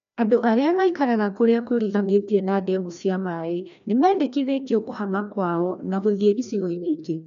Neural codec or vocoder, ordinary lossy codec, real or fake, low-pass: codec, 16 kHz, 1 kbps, FreqCodec, larger model; none; fake; 7.2 kHz